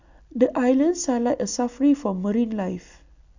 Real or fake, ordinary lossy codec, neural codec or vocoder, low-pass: real; none; none; 7.2 kHz